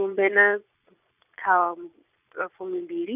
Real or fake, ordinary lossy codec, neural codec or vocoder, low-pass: fake; none; vocoder, 22.05 kHz, 80 mel bands, Vocos; 3.6 kHz